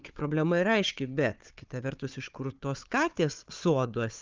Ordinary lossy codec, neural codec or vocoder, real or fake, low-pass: Opus, 32 kbps; codec, 16 kHz, 4 kbps, FunCodec, trained on Chinese and English, 50 frames a second; fake; 7.2 kHz